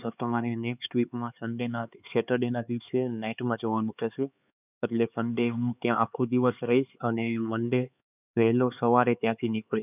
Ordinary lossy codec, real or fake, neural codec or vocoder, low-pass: none; fake; codec, 16 kHz, 4 kbps, X-Codec, HuBERT features, trained on LibriSpeech; 3.6 kHz